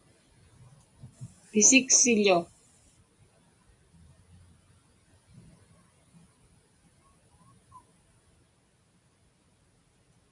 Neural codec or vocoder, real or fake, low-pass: none; real; 10.8 kHz